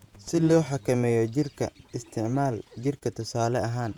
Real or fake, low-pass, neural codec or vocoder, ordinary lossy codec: fake; 19.8 kHz; vocoder, 48 kHz, 128 mel bands, Vocos; none